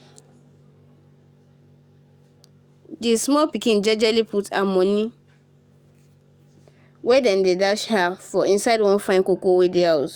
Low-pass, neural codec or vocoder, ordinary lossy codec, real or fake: 19.8 kHz; codec, 44.1 kHz, 7.8 kbps, DAC; Opus, 64 kbps; fake